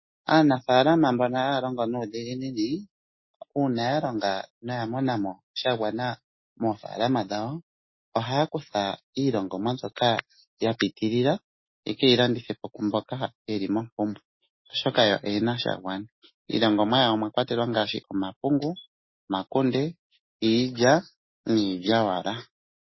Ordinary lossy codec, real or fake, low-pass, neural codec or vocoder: MP3, 24 kbps; real; 7.2 kHz; none